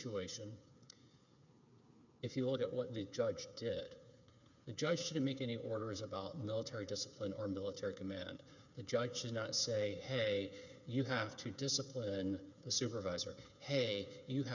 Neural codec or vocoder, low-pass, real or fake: codec, 16 kHz, 8 kbps, FreqCodec, smaller model; 7.2 kHz; fake